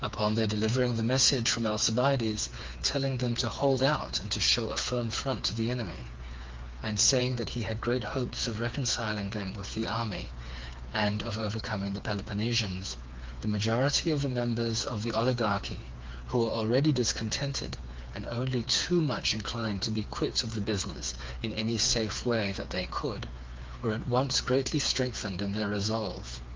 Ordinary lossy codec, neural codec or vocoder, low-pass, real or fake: Opus, 32 kbps; codec, 16 kHz, 4 kbps, FreqCodec, smaller model; 7.2 kHz; fake